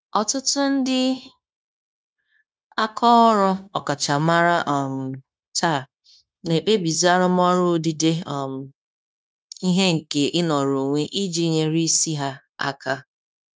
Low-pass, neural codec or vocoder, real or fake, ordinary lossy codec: none; codec, 16 kHz, 0.9 kbps, LongCat-Audio-Codec; fake; none